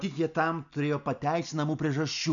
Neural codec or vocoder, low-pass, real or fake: none; 7.2 kHz; real